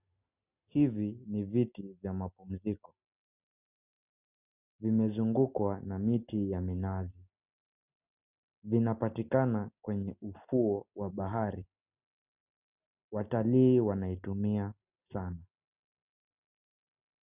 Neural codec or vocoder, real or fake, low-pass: none; real; 3.6 kHz